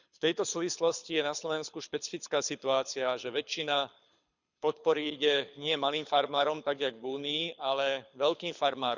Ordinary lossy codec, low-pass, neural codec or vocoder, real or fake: none; 7.2 kHz; codec, 24 kHz, 6 kbps, HILCodec; fake